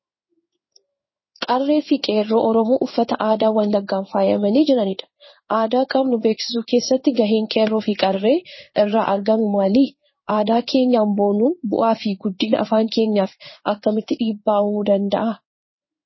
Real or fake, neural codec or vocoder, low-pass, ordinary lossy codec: fake; codec, 16 kHz in and 24 kHz out, 1 kbps, XY-Tokenizer; 7.2 kHz; MP3, 24 kbps